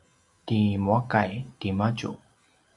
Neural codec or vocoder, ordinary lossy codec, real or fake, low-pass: none; AAC, 64 kbps; real; 10.8 kHz